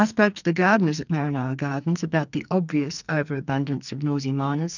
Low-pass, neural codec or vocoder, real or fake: 7.2 kHz; codec, 44.1 kHz, 2.6 kbps, SNAC; fake